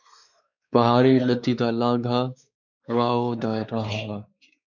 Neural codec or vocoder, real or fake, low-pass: codec, 16 kHz, 4 kbps, X-Codec, WavLM features, trained on Multilingual LibriSpeech; fake; 7.2 kHz